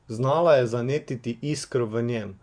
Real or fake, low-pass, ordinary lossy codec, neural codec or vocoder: fake; 9.9 kHz; Opus, 64 kbps; vocoder, 24 kHz, 100 mel bands, Vocos